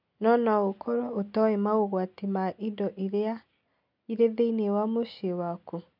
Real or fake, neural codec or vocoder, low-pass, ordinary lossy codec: real; none; 5.4 kHz; none